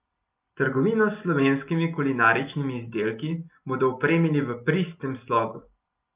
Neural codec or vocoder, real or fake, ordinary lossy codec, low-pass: none; real; Opus, 24 kbps; 3.6 kHz